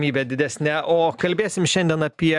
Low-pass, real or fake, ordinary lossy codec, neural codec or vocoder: 10.8 kHz; real; MP3, 96 kbps; none